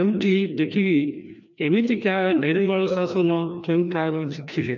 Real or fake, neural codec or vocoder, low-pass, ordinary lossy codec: fake; codec, 16 kHz, 1 kbps, FreqCodec, larger model; 7.2 kHz; none